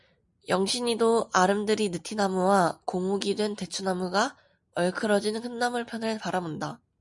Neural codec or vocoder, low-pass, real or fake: none; 10.8 kHz; real